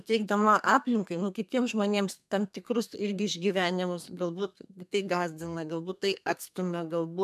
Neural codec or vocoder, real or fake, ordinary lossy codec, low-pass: codec, 32 kHz, 1.9 kbps, SNAC; fake; MP3, 96 kbps; 14.4 kHz